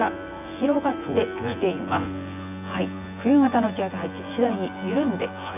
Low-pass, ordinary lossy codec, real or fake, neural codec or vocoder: 3.6 kHz; none; fake; vocoder, 24 kHz, 100 mel bands, Vocos